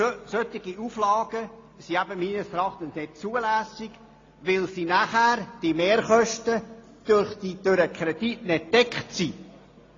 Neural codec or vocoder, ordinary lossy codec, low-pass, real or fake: none; AAC, 32 kbps; 7.2 kHz; real